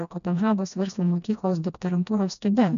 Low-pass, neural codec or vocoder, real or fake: 7.2 kHz; codec, 16 kHz, 1 kbps, FreqCodec, smaller model; fake